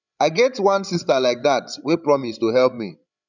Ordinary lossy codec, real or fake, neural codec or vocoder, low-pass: none; fake; codec, 16 kHz, 16 kbps, FreqCodec, larger model; 7.2 kHz